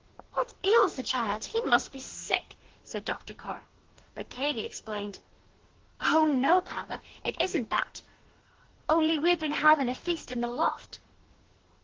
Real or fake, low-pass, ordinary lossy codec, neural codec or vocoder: fake; 7.2 kHz; Opus, 16 kbps; codec, 44.1 kHz, 2.6 kbps, DAC